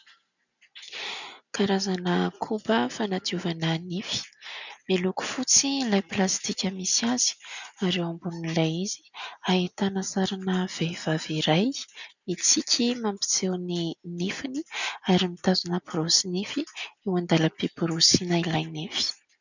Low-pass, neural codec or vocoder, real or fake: 7.2 kHz; none; real